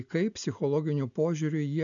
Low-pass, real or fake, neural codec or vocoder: 7.2 kHz; real; none